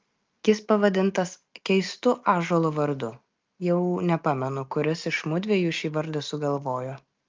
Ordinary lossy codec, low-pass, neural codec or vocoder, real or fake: Opus, 24 kbps; 7.2 kHz; none; real